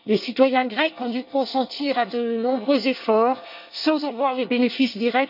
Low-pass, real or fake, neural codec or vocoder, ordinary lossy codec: 5.4 kHz; fake; codec, 24 kHz, 1 kbps, SNAC; none